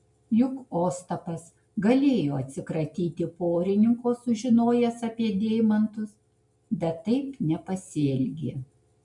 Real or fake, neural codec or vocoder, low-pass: real; none; 10.8 kHz